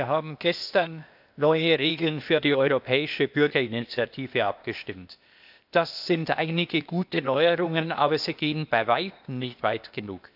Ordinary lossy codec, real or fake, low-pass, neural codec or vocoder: none; fake; 5.4 kHz; codec, 16 kHz, 0.8 kbps, ZipCodec